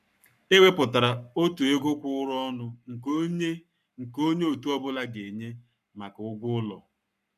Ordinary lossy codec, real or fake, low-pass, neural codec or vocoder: none; fake; 14.4 kHz; codec, 44.1 kHz, 7.8 kbps, Pupu-Codec